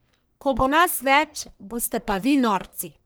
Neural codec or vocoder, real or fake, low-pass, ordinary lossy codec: codec, 44.1 kHz, 1.7 kbps, Pupu-Codec; fake; none; none